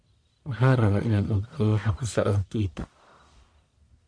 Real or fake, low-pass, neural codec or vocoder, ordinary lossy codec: fake; 9.9 kHz; codec, 44.1 kHz, 1.7 kbps, Pupu-Codec; MP3, 48 kbps